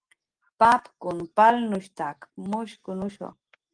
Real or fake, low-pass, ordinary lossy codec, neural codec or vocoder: real; 9.9 kHz; Opus, 24 kbps; none